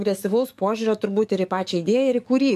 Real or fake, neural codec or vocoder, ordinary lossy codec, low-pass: fake; codec, 44.1 kHz, 7.8 kbps, DAC; MP3, 96 kbps; 14.4 kHz